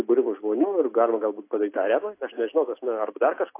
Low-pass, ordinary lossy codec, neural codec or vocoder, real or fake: 3.6 kHz; AAC, 24 kbps; none; real